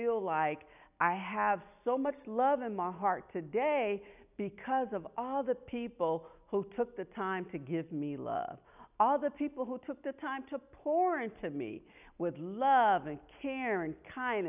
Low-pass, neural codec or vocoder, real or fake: 3.6 kHz; none; real